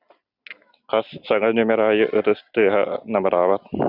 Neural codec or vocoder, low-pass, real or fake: none; 5.4 kHz; real